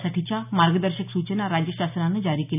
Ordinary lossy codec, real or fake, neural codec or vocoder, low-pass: none; real; none; 3.6 kHz